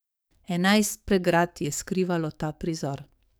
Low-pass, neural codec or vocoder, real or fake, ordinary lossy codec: none; codec, 44.1 kHz, 7.8 kbps, DAC; fake; none